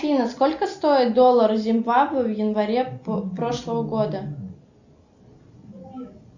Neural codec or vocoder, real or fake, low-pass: none; real; 7.2 kHz